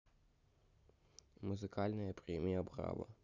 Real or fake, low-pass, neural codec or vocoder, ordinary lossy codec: real; 7.2 kHz; none; none